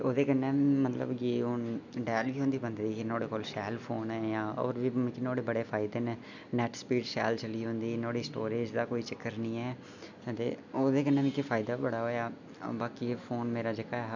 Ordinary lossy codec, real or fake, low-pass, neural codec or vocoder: none; real; 7.2 kHz; none